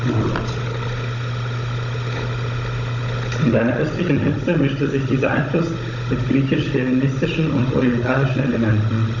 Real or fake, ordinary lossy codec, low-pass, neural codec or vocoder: fake; none; 7.2 kHz; codec, 16 kHz, 16 kbps, FunCodec, trained on Chinese and English, 50 frames a second